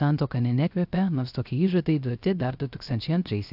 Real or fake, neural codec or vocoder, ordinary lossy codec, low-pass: fake; codec, 16 kHz, 0.3 kbps, FocalCodec; AAC, 48 kbps; 5.4 kHz